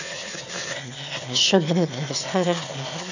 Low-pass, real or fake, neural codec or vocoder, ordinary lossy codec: 7.2 kHz; fake; autoencoder, 22.05 kHz, a latent of 192 numbers a frame, VITS, trained on one speaker; none